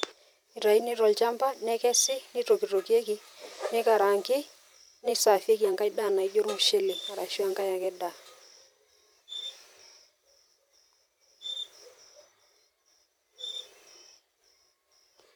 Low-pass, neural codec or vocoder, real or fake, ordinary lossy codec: 19.8 kHz; vocoder, 44.1 kHz, 128 mel bands every 512 samples, BigVGAN v2; fake; none